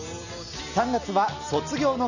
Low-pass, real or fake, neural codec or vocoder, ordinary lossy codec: 7.2 kHz; real; none; none